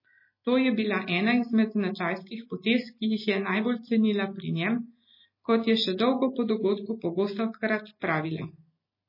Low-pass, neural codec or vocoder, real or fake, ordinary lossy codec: 5.4 kHz; none; real; MP3, 24 kbps